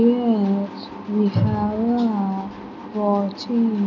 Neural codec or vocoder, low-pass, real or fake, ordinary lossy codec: none; 7.2 kHz; real; none